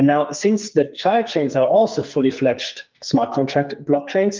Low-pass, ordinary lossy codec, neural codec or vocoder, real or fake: 7.2 kHz; Opus, 24 kbps; codec, 44.1 kHz, 2.6 kbps, SNAC; fake